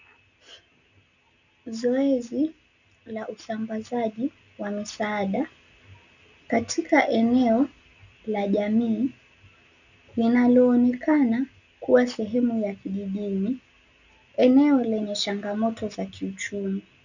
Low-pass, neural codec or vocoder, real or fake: 7.2 kHz; none; real